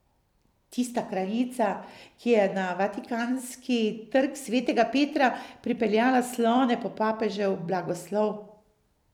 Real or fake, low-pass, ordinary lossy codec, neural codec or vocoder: fake; 19.8 kHz; none; vocoder, 44.1 kHz, 128 mel bands every 256 samples, BigVGAN v2